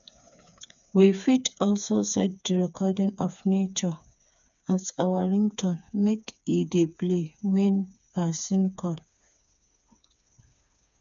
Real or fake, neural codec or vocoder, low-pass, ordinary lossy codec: fake; codec, 16 kHz, 4 kbps, FreqCodec, smaller model; 7.2 kHz; none